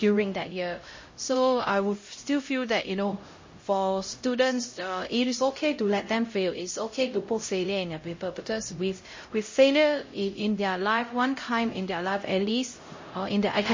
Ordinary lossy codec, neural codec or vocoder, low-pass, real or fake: MP3, 32 kbps; codec, 16 kHz, 0.5 kbps, X-Codec, HuBERT features, trained on LibriSpeech; 7.2 kHz; fake